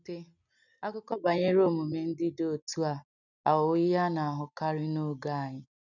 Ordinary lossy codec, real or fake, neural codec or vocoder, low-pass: none; real; none; 7.2 kHz